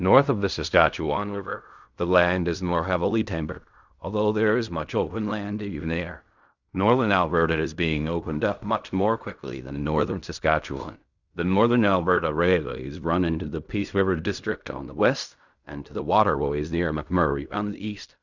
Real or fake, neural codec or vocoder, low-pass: fake; codec, 16 kHz in and 24 kHz out, 0.4 kbps, LongCat-Audio-Codec, fine tuned four codebook decoder; 7.2 kHz